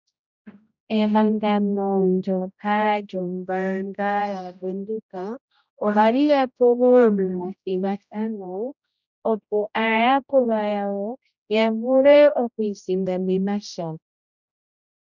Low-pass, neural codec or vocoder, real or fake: 7.2 kHz; codec, 16 kHz, 0.5 kbps, X-Codec, HuBERT features, trained on general audio; fake